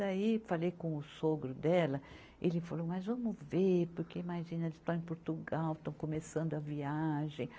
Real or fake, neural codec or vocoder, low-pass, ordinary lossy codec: real; none; none; none